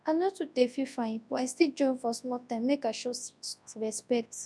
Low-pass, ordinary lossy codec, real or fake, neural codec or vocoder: none; none; fake; codec, 24 kHz, 0.9 kbps, WavTokenizer, large speech release